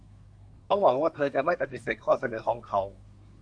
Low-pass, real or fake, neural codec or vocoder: 9.9 kHz; fake; codec, 24 kHz, 1 kbps, SNAC